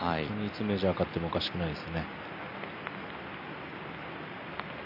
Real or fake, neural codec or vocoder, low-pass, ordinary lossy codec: real; none; 5.4 kHz; AAC, 48 kbps